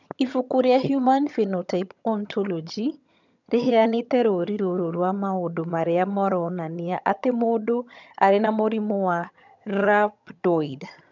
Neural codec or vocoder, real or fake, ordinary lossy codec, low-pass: vocoder, 22.05 kHz, 80 mel bands, HiFi-GAN; fake; none; 7.2 kHz